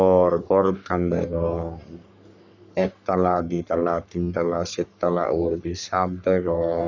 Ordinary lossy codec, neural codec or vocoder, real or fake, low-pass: none; codec, 44.1 kHz, 3.4 kbps, Pupu-Codec; fake; 7.2 kHz